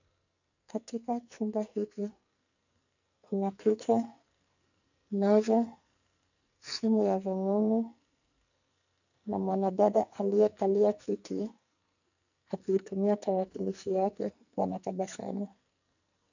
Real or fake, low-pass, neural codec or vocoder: fake; 7.2 kHz; codec, 44.1 kHz, 2.6 kbps, SNAC